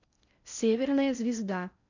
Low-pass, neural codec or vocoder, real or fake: 7.2 kHz; codec, 16 kHz in and 24 kHz out, 0.6 kbps, FocalCodec, streaming, 4096 codes; fake